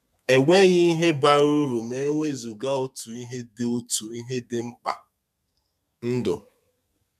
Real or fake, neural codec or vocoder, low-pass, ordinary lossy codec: fake; codec, 32 kHz, 1.9 kbps, SNAC; 14.4 kHz; none